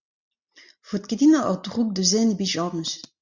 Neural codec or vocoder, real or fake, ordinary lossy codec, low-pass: none; real; Opus, 64 kbps; 7.2 kHz